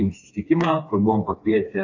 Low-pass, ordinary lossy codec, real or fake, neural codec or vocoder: 7.2 kHz; AAC, 48 kbps; fake; codec, 16 kHz, 2 kbps, FreqCodec, smaller model